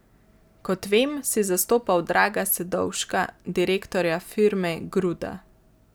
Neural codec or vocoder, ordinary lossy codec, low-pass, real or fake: none; none; none; real